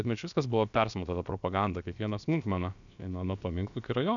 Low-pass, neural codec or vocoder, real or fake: 7.2 kHz; codec, 16 kHz, about 1 kbps, DyCAST, with the encoder's durations; fake